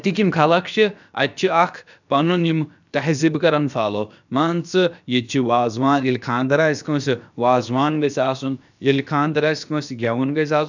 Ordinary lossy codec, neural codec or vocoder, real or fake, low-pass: none; codec, 16 kHz, about 1 kbps, DyCAST, with the encoder's durations; fake; 7.2 kHz